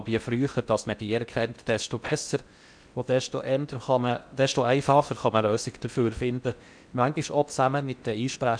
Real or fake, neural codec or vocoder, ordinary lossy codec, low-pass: fake; codec, 16 kHz in and 24 kHz out, 0.6 kbps, FocalCodec, streaming, 4096 codes; none; 9.9 kHz